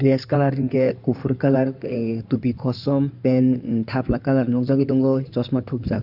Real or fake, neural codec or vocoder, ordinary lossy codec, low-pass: fake; codec, 16 kHz in and 24 kHz out, 2.2 kbps, FireRedTTS-2 codec; none; 5.4 kHz